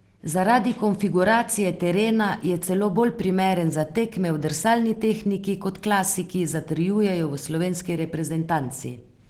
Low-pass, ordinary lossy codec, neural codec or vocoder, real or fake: 19.8 kHz; Opus, 16 kbps; none; real